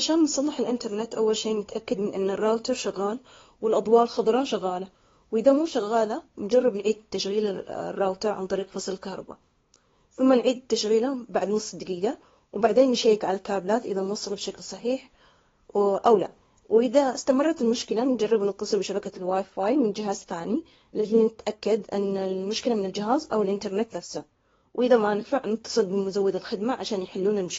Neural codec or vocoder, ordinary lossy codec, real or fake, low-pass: codec, 16 kHz, 2 kbps, FunCodec, trained on LibriTTS, 25 frames a second; AAC, 24 kbps; fake; 7.2 kHz